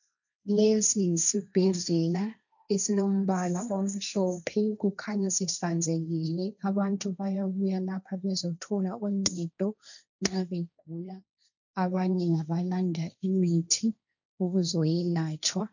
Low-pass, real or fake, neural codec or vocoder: 7.2 kHz; fake; codec, 16 kHz, 1.1 kbps, Voila-Tokenizer